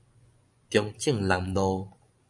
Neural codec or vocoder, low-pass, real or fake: vocoder, 24 kHz, 100 mel bands, Vocos; 10.8 kHz; fake